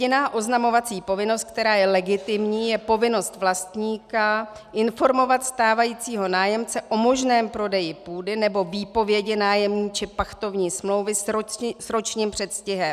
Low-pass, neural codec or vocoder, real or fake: 14.4 kHz; none; real